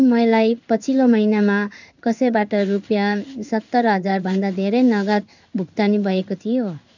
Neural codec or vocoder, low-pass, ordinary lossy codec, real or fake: codec, 16 kHz in and 24 kHz out, 1 kbps, XY-Tokenizer; 7.2 kHz; none; fake